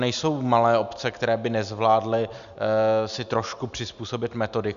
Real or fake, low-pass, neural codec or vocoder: real; 7.2 kHz; none